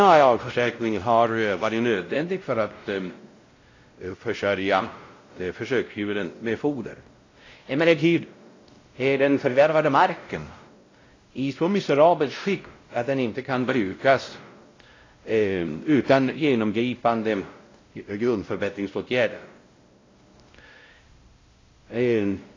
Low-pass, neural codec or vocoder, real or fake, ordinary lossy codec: 7.2 kHz; codec, 16 kHz, 0.5 kbps, X-Codec, WavLM features, trained on Multilingual LibriSpeech; fake; AAC, 32 kbps